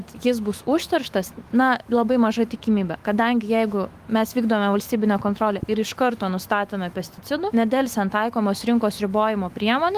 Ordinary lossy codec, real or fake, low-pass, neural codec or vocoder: Opus, 24 kbps; fake; 14.4 kHz; autoencoder, 48 kHz, 128 numbers a frame, DAC-VAE, trained on Japanese speech